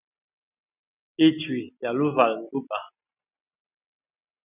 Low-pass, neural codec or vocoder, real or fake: 3.6 kHz; none; real